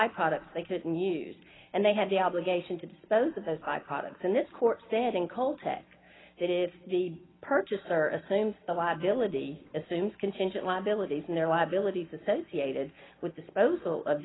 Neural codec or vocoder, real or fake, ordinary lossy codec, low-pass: none; real; AAC, 16 kbps; 7.2 kHz